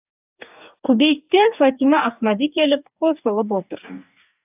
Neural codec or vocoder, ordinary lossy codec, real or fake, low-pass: codec, 44.1 kHz, 2.6 kbps, DAC; AAC, 32 kbps; fake; 3.6 kHz